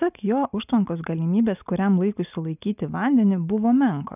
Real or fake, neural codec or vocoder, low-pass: real; none; 3.6 kHz